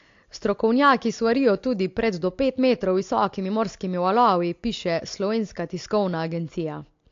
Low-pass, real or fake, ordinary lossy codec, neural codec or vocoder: 7.2 kHz; real; AAC, 48 kbps; none